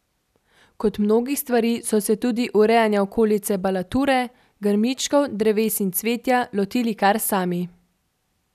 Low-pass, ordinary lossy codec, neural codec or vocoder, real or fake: 14.4 kHz; none; none; real